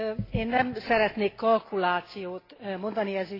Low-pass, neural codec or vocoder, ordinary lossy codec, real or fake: 5.4 kHz; none; AAC, 24 kbps; real